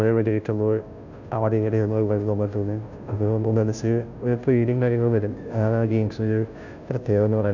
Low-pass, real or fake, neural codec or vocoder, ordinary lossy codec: 7.2 kHz; fake; codec, 16 kHz, 0.5 kbps, FunCodec, trained on Chinese and English, 25 frames a second; none